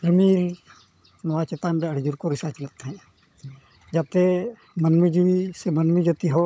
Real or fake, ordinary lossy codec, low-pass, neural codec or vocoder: fake; none; none; codec, 16 kHz, 16 kbps, FunCodec, trained on LibriTTS, 50 frames a second